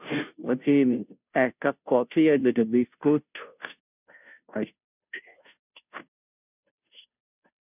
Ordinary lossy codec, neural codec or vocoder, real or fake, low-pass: none; codec, 16 kHz, 0.5 kbps, FunCodec, trained on Chinese and English, 25 frames a second; fake; 3.6 kHz